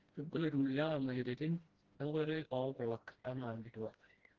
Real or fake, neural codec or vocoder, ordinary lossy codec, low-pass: fake; codec, 16 kHz, 1 kbps, FreqCodec, smaller model; Opus, 16 kbps; 7.2 kHz